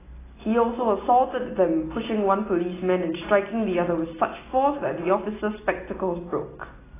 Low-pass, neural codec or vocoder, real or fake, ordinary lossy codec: 3.6 kHz; none; real; AAC, 16 kbps